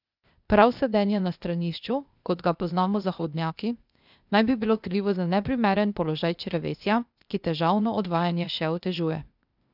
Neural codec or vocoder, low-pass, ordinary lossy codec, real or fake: codec, 16 kHz, 0.8 kbps, ZipCodec; 5.4 kHz; none; fake